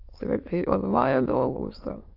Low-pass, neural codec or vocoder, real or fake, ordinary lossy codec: 5.4 kHz; autoencoder, 22.05 kHz, a latent of 192 numbers a frame, VITS, trained on many speakers; fake; AAC, 32 kbps